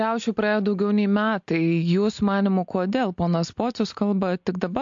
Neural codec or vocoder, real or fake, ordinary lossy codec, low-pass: none; real; AAC, 64 kbps; 7.2 kHz